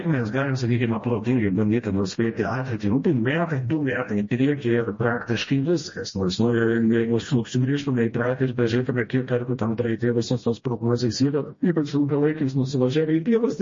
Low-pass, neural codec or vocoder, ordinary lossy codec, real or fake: 7.2 kHz; codec, 16 kHz, 1 kbps, FreqCodec, smaller model; MP3, 32 kbps; fake